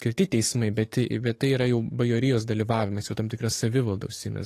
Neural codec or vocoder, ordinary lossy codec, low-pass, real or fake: codec, 44.1 kHz, 7.8 kbps, DAC; AAC, 48 kbps; 14.4 kHz; fake